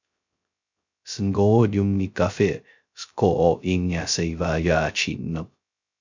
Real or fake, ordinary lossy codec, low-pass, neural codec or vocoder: fake; MP3, 64 kbps; 7.2 kHz; codec, 16 kHz, 0.2 kbps, FocalCodec